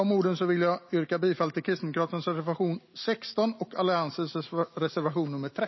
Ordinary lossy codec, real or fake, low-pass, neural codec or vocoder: MP3, 24 kbps; real; 7.2 kHz; none